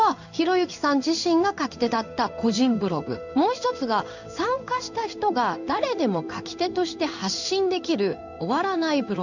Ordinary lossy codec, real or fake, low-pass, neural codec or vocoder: none; fake; 7.2 kHz; codec, 16 kHz in and 24 kHz out, 1 kbps, XY-Tokenizer